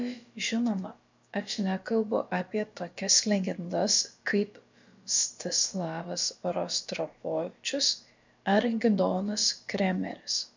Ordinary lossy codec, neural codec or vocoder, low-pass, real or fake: MP3, 64 kbps; codec, 16 kHz, about 1 kbps, DyCAST, with the encoder's durations; 7.2 kHz; fake